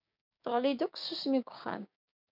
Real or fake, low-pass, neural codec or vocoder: fake; 5.4 kHz; codec, 16 kHz, 6 kbps, DAC